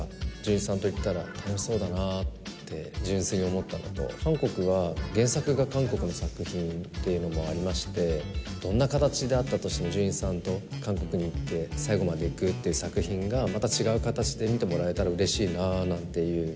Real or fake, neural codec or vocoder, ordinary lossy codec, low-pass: real; none; none; none